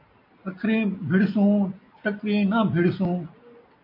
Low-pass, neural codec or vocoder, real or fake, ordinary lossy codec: 5.4 kHz; none; real; MP3, 32 kbps